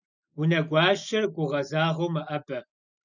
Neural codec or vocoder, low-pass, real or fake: none; 7.2 kHz; real